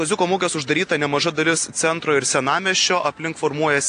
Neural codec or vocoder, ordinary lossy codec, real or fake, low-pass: none; AAC, 48 kbps; real; 9.9 kHz